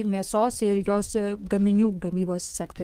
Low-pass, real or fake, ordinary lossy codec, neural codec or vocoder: 14.4 kHz; fake; Opus, 24 kbps; codec, 32 kHz, 1.9 kbps, SNAC